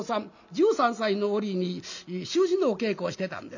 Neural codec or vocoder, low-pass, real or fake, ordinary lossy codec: none; 7.2 kHz; real; none